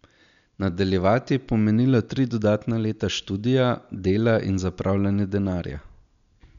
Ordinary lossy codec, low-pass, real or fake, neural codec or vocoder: none; 7.2 kHz; real; none